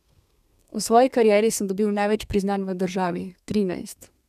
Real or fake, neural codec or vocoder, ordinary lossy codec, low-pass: fake; codec, 32 kHz, 1.9 kbps, SNAC; none; 14.4 kHz